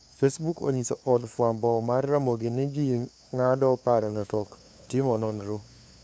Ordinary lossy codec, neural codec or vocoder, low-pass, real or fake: none; codec, 16 kHz, 2 kbps, FunCodec, trained on LibriTTS, 25 frames a second; none; fake